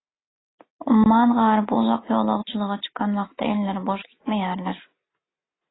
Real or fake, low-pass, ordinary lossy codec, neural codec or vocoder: real; 7.2 kHz; AAC, 16 kbps; none